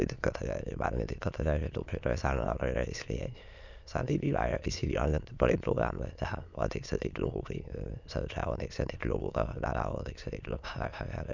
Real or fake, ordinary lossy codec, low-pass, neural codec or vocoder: fake; none; 7.2 kHz; autoencoder, 22.05 kHz, a latent of 192 numbers a frame, VITS, trained on many speakers